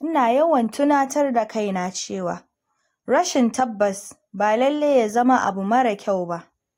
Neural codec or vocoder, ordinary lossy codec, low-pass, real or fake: none; AAC, 48 kbps; 19.8 kHz; real